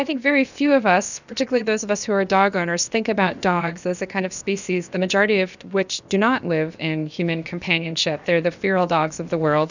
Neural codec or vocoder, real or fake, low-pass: codec, 16 kHz, about 1 kbps, DyCAST, with the encoder's durations; fake; 7.2 kHz